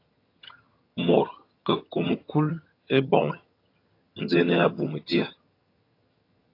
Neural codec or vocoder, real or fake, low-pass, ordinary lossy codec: vocoder, 22.05 kHz, 80 mel bands, HiFi-GAN; fake; 5.4 kHz; AAC, 32 kbps